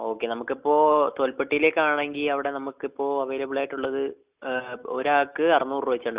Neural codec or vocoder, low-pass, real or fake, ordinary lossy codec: none; 3.6 kHz; real; Opus, 64 kbps